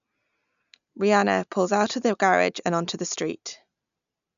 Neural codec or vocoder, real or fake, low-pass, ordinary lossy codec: none; real; 7.2 kHz; none